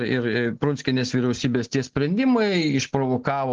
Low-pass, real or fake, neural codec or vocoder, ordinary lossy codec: 7.2 kHz; real; none; Opus, 16 kbps